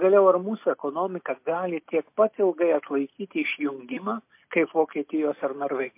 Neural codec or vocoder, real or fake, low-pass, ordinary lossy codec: none; real; 3.6 kHz; MP3, 24 kbps